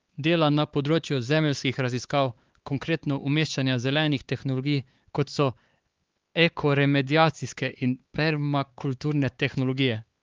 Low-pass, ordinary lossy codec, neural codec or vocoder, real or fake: 7.2 kHz; Opus, 32 kbps; codec, 16 kHz, 4 kbps, X-Codec, HuBERT features, trained on LibriSpeech; fake